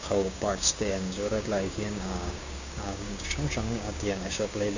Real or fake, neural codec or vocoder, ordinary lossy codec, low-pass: fake; codec, 16 kHz, 6 kbps, DAC; Opus, 64 kbps; 7.2 kHz